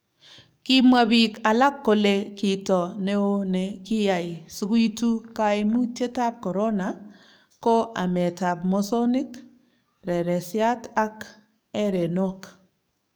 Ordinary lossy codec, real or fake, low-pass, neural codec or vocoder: none; fake; none; codec, 44.1 kHz, 7.8 kbps, DAC